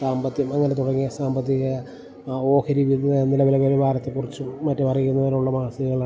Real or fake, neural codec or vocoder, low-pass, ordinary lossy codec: real; none; none; none